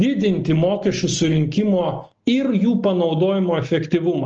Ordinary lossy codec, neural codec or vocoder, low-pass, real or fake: Opus, 24 kbps; none; 7.2 kHz; real